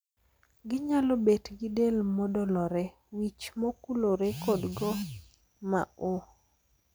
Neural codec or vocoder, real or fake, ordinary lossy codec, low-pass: none; real; none; none